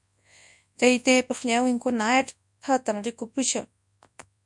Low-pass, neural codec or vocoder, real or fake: 10.8 kHz; codec, 24 kHz, 0.9 kbps, WavTokenizer, large speech release; fake